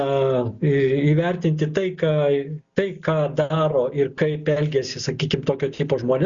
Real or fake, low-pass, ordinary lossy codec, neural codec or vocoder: real; 7.2 kHz; Opus, 64 kbps; none